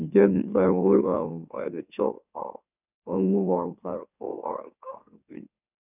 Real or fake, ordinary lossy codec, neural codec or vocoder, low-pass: fake; none; autoencoder, 44.1 kHz, a latent of 192 numbers a frame, MeloTTS; 3.6 kHz